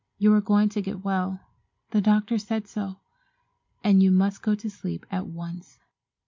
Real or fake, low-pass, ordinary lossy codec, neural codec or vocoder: real; 7.2 kHz; MP3, 64 kbps; none